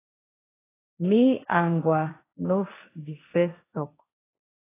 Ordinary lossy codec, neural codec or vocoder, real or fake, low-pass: AAC, 16 kbps; codec, 16 kHz, 1.1 kbps, Voila-Tokenizer; fake; 3.6 kHz